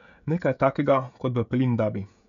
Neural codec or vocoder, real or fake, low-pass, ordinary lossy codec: codec, 16 kHz, 16 kbps, FreqCodec, smaller model; fake; 7.2 kHz; none